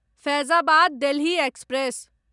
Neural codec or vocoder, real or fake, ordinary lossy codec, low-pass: none; real; none; 10.8 kHz